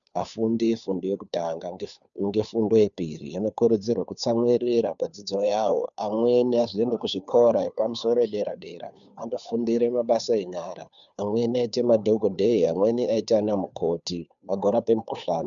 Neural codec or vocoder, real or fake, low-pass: codec, 16 kHz, 2 kbps, FunCodec, trained on Chinese and English, 25 frames a second; fake; 7.2 kHz